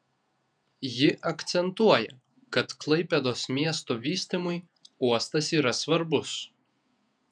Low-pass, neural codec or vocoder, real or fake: 9.9 kHz; vocoder, 48 kHz, 128 mel bands, Vocos; fake